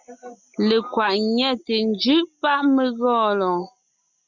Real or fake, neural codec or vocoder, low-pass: real; none; 7.2 kHz